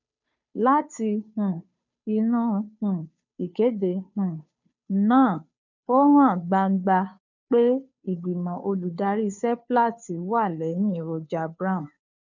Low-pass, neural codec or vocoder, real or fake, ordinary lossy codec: 7.2 kHz; codec, 16 kHz, 2 kbps, FunCodec, trained on Chinese and English, 25 frames a second; fake; none